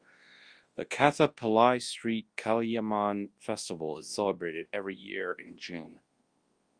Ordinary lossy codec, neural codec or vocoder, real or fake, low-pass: Opus, 24 kbps; codec, 24 kHz, 0.9 kbps, WavTokenizer, large speech release; fake; 9.9 kHz